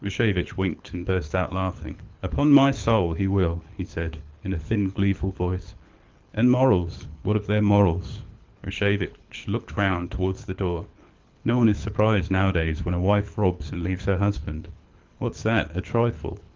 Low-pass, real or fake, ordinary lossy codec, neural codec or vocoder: 7.2 kHz; fake; Opus, 32 kbps; codec, 24 kHz, 6 kbps, HILCodec